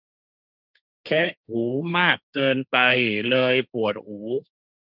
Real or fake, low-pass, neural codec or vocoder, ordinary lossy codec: fake; 5.4 kHz; codec, 16 kHz, 1.1 kbps, Voila-Tokenizer; none